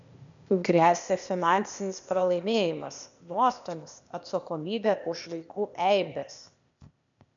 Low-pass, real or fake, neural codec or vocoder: 7.2 kHz; fake; codec, 16 kHz, 0.8 kbps, ZipCodec